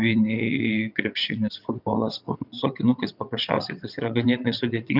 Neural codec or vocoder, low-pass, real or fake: vocoder, 22.05 kHz, 80 mel bands, WaveNeXt; 5.4 kHz; fake